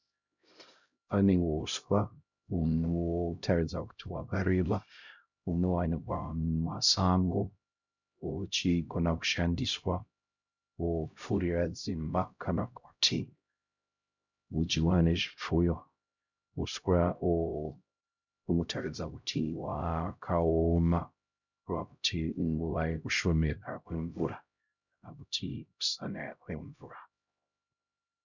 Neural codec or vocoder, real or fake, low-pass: codec, 16 kHz, 0.5 kbps, X-Codec, HuBERT features, trained on LibriSpeech; fake; 7.2 kHz